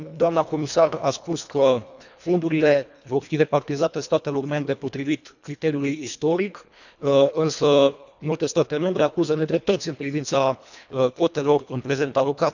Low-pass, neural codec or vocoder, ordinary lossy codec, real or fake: 7.2 kHz; codec, 24 kHz, 1.5 kbps, HILCodec; none; fake